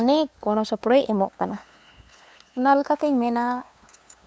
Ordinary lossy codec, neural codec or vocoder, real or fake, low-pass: none; codec, 16 kHz, 2 kbps, FunCodec, trained on LibriTTS, 25 frames a second; fake; none